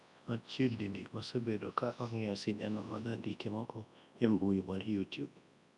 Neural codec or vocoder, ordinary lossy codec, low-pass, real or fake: codec, 24 kHz, 0.9 kbps, WavTokenizer, large speech release; none; 10.8 kHz; fake